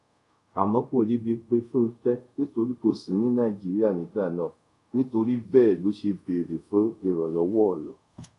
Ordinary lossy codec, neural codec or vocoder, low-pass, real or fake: none; codec, 24 kHz, 0.5 kbps, DualCodec; 10.8 kHz; fake